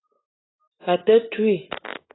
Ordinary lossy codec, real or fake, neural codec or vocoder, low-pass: AAC, 16 kbps; real; none; 7.2 kHz